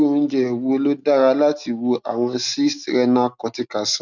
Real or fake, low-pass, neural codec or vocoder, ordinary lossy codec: real; 7.2 kHz; none; none